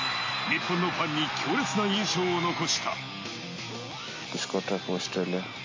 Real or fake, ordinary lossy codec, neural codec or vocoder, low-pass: real; MP3, 32 kbps; none; 7.2 kHz